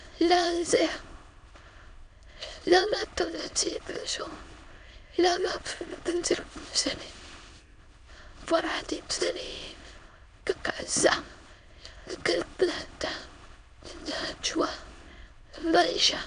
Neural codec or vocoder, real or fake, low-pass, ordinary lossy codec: autoencoder, 22.05 kHz, a latent of 192 numbers a frame, VITS, trained on many speakers; fake; 9.9 kHz; AAC, 96 kbps